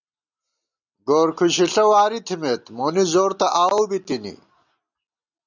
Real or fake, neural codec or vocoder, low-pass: real; none; 7.2 kHz